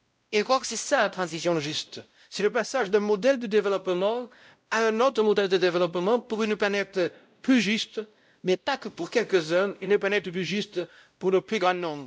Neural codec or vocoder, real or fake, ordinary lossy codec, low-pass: codec, 16 kHz, 0.5 kbps, X-Codec, WavLM features, trained on Multilingual LibriSpeech; fake; none; none